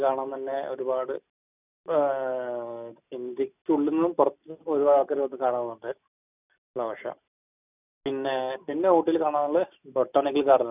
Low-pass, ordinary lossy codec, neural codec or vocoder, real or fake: 3.6 kHz; none; none; real